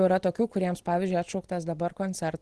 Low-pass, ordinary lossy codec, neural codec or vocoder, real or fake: 10.8 kHz; Opus, 16 kbps; none; real